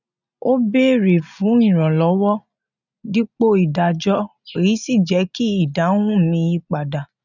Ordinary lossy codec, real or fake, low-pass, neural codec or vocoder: none; real; 7.2 kHz; none